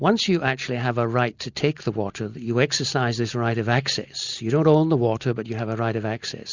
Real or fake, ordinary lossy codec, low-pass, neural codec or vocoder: real; Opus, 64 kbps; 7.2 kHz; none